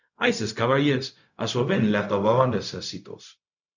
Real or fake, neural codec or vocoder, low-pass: fake; codec, 16 kHz, 0.4 kbps, LongCat-Audio-Codec; 7.2 kHz